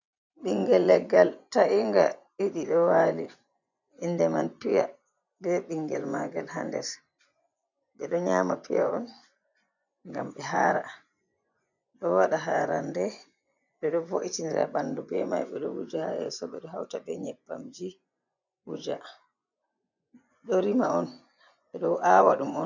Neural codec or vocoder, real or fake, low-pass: vocoder, 24 kHz, 100 mel bands, Vocos; fake; 7.2 kHz